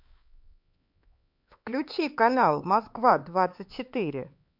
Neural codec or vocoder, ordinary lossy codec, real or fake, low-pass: codec, 16 kHz, 4 kbps, X-Codec, HuBERT features, trained on LibriSpeech; MP3, 48 kbps; fake; 5.4 kHz